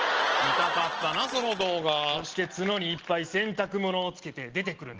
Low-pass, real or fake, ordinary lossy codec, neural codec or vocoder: 7.2 kHz; real; Opus, 16 kbps; none